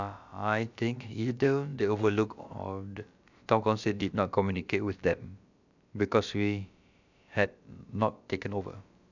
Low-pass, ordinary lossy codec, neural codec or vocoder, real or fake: 7.2 kHz; none; codec, 16 kHz, about 1 kbps, DyCAST, with the encoder's durations; fake